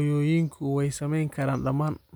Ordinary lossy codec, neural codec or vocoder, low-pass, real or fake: none; none; none; real